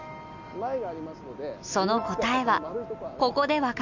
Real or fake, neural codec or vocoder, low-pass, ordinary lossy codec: real; none; 7.2 kHz; none